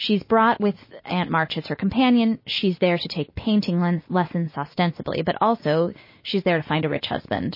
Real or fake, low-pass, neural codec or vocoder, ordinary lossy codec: real; 5.4 kHz; none; MP3, 24 kbps